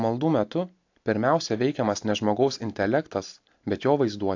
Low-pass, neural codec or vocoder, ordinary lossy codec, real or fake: 7.2 kHz; none; AAC, 48 kbps; real